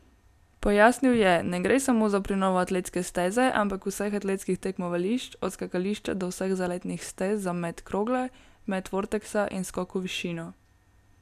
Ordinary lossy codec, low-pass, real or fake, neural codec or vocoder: none; 14.4 kHz; real; none